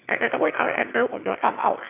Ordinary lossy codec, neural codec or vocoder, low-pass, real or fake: none; autoencoder, 22.05 kHz, a latent of 192 numbers a frame, VITS, trained on one speaker; 3.6 kHz; fake